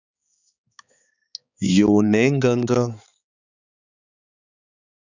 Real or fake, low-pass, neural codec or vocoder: fake; 7.2 kHz; codec, 16 kHz, 4 kbps, X-Codec, HuBERT features, trained on balanced general audio